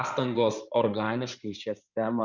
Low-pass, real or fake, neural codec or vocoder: 7.2 kHz; fake; codec, 16 kHz in and 24 kHz out, 2.2 kbps, FireRedTTS-2 codec